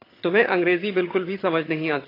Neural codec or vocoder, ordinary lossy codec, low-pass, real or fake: vocoder, 22.05 kHz, 80 mel bands, HiFi-GAN; AAC, 24 kbps; 5.4 kHz; fake